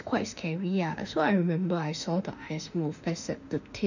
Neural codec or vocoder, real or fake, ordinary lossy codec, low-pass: autoencoder, 48 kHz, 32 numbers a frame, DAC-VAE, trained on Japanese speech; fake; none; 7.2 kHz